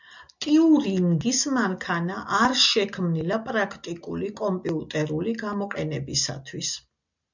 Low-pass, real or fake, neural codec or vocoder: 7.2 kHz; real; none